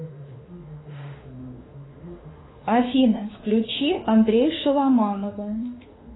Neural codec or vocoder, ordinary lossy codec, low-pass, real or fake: autoencoder, 48 kHz, 32 numbers a frame, DAC-VAE, trained on Japanese speech; AAC, 16 kbps; 7.2 kHz; fake